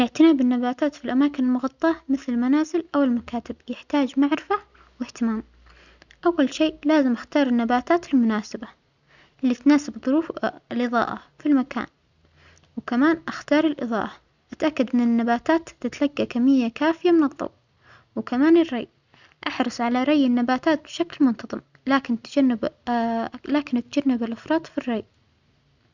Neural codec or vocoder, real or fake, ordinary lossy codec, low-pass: none; real; none; 7.2 kHz